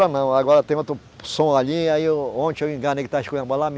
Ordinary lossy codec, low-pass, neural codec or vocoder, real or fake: none; none; none; real